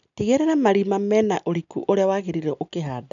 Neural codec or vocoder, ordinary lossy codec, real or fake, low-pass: none; none; real; 7.2 kHz